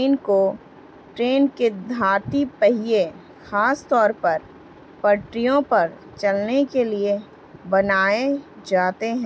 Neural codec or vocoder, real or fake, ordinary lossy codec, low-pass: none; real; none; none